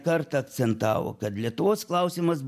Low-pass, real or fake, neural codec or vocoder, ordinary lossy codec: 14.4 kHz; real; none; MP3, 96 kbps